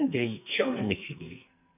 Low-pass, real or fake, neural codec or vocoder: 3.6 kHz; fake; codec, 44.1 kHz, 2.6 kbps, SNAC